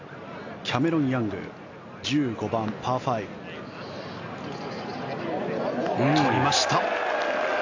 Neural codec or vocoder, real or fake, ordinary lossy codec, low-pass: none; real; AAC, 48 kbps; 7.2 kHz